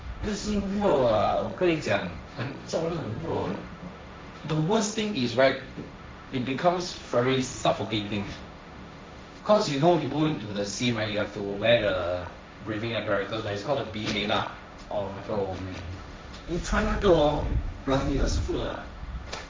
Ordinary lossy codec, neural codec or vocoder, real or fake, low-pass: none; codec, 16 kHz, 1.1 kbps, Voila-Tokenizer; fake; none